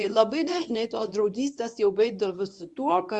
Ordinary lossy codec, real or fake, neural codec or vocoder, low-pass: Opus, 64 kbps; fake; codec, 24 kHz, 0.9 kbps, WavTokenizer, medium speech release version 1; 10.8 kHz